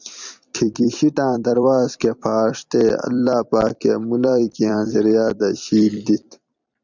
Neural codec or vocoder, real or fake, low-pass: vocoder, 44.1 kHz, 128 mel bands every 256 samples, BigVGAN v2; fake; 7.2 kHz